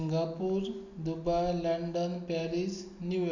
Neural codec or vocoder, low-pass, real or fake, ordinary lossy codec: none; 7.2 kHz; real; none